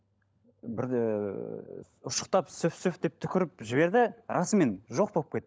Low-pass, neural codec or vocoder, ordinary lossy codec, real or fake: none; codec, 16 kHz, 16 kbps, FunCodec, trained on LibriTTS, 50 frames a second; none; fake